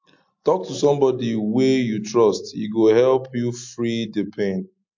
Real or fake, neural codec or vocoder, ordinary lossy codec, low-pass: real; none; MP3, 48 kbps; 7.2 kHz